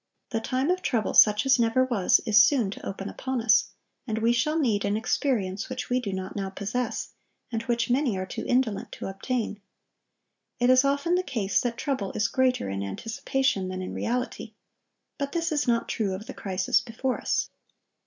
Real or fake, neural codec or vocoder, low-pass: real; none; 7.2 kHz